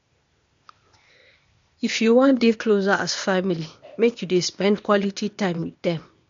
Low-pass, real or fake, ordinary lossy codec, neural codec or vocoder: 7.2 kHz; fake; MP3, 48 kbps; codec, 16 kHz, 0.8 kbps, ZipCodec